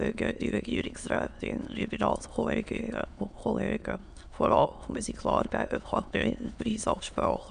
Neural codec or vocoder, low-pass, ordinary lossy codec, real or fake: autoencoder, 22.05 kHz, a latent of 192 numbers a frame, VITS, trained on many speakers; 9.9 kHz; none; fake